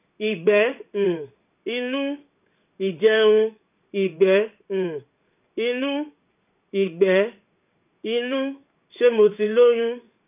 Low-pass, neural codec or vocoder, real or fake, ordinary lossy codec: 3.6 kHz; codec, 16 kHz in and 24 kHz out, 1 kbps, XY-Tokenizer; fake; none